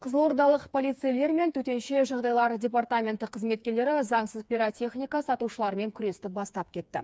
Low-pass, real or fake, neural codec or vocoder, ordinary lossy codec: none; fake; codec, 16 kHz, 4 kbps, FreqCodec, smaller model; none